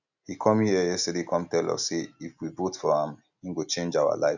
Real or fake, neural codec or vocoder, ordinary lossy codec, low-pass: real; none; none; 7.2 kHz